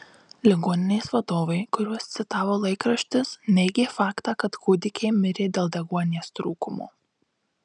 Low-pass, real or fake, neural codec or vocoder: 9.9 kHz; real; none